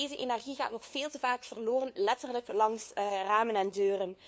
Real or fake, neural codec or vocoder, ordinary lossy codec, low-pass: fake; codec, 16 kHz, 2 kbps, FunCodec, trained on LibriTTS, 25 frames a second; none; none